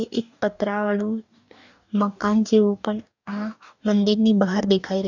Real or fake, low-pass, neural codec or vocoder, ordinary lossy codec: fake; 7.2 kHz; codec, 44.1 kHz, 2.6 kbps, DAC; none